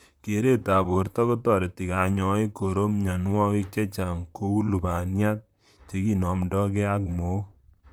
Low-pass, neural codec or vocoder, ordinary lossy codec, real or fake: 19.8 kHz; vocoder, 44.1 kHz, 128 mel bands, Pupu-Vocoder; none; fake